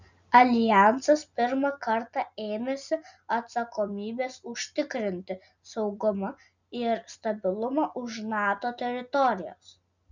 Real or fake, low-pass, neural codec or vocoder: real; 7.2 kHz; none